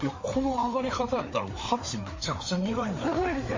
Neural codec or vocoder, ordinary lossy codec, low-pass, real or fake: codec, 16 kHz, 4 kbps, FreqCodec, larger model; MP3, 32 kbps; 7.2 kHz; fake